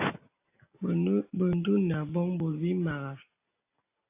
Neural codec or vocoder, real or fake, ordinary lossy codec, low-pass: none; real; AAC, 24 kbps; 3.6 kHz